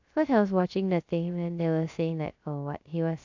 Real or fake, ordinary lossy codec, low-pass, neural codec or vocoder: fake; AAC, 48 kbps; 7.2 kHz; codec, 16 kHz, 0.3 kbps, FocalCodec